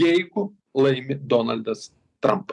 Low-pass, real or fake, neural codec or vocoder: 10.8 kHz; fake; vocoder, 44.1 kHz, 128 mel bands every 512 samples, BigVGAN v2